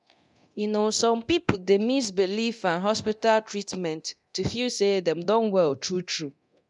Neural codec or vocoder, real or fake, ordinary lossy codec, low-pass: codec, 24 kHz, 0.9 kbps, DualCodec; fake; none; 10.8 kHz